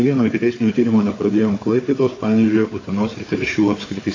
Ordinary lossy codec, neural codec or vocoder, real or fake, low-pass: AAC, 32 kbps; codec, 16 kHz, 4 kbps, FunCodec, trained on Chinese and English, 50 frames a second; fake; 7.2 kHz